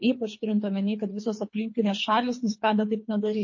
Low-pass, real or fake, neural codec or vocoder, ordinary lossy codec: 7.2 kHz; fake; codec, 24 kHz, 3 kbps, HILCodec; MP3, 32 kbps